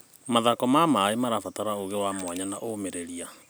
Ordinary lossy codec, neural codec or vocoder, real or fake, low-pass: none; none; real; none